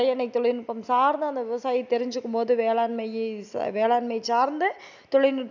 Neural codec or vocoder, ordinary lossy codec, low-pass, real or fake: none; none; 7.2 kHz; real